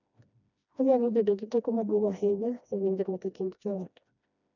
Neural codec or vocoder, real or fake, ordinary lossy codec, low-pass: codec, 16 kHz, 1 kbps, FreqCodec, smaller model; fake; none; 7.2 kHz